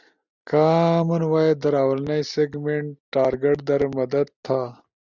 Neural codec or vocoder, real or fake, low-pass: none; real; 7.2 kHz